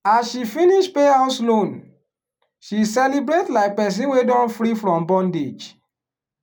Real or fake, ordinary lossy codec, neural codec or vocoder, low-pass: real; none; none; none